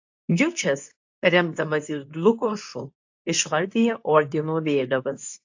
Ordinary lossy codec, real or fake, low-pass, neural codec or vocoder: AAC, 48 kbps; fake; 7.2 kHz; codec, 24 kHz, 0.9 kbps, WavTokenizer, medium speech release version 2